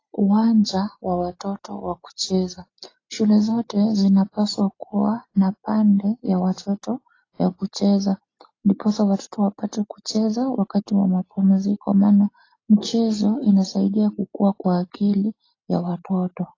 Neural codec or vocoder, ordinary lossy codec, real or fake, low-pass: none; AAC, 32 kbps; real; 7.2 kHz